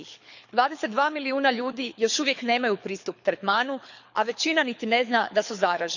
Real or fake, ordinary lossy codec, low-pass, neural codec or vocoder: fake; none; 7.2 kHz; codec, 24 kHz, 6 kbps, HILCodec